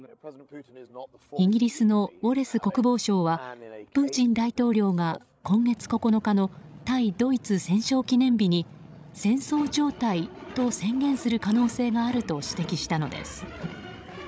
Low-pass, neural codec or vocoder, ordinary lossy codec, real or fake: none; codec, 16 kHz, 16 kbps, FreqCodec, larger model; none; fake